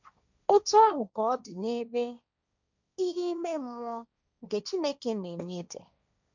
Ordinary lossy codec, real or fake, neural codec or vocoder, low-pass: none; fake; codec, 16 kHz, 1.1 kbps, Voila-Tokenizer; 7.2 kHz